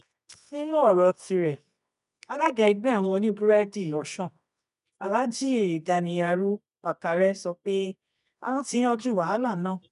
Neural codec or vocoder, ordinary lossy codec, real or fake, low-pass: codec, 24 kHz, 0.9 kbps, WavTokenizer, medium music audio release; none; fake; 10.8 kHz